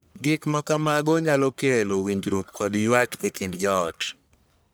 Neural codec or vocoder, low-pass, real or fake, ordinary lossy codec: codec, 44.1 kHz, 1.7 kbps, Pupu-Codec; none; fake; none